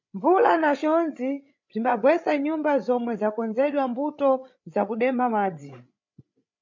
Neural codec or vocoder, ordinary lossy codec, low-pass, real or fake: codec, 16 kHz, 8 kbps, FreqCodec, larger model; MP3, 48 kbps; 7.2 kHz; fake